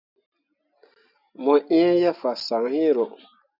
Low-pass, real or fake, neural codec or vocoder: 5.4 kHz; real; none